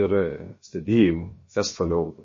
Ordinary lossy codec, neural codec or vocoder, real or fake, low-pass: MP3, 32 kbps; codec, 16 kHz, about 1 kbps, DyCAST, with the encoder's durations; fake; 7.2 kHz